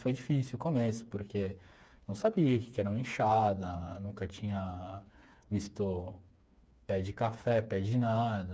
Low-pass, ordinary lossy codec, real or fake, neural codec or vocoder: none; none; fake; codec, 16 kHz, 4 kbps, FreqCodec, smaller model